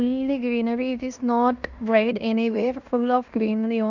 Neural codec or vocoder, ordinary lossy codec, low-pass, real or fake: codec, 16 kHz in and 24 kHz out, 0.9 kbps, LongCat-Audio-Codec, fine tuned four codebook decoder; none; 7.2 kHz; fake